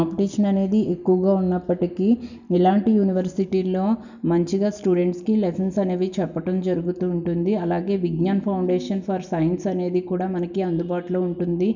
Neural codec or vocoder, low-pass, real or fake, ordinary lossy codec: none; 7.2 kHz; real; none